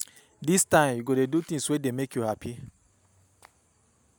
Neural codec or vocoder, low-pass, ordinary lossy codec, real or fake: none; none; none; real